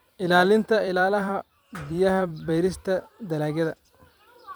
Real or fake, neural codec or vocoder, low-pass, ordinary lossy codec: fake; vocoder, 44.1 kHz, 128 mel bands every 256 samples, BigVGAN v2; none; none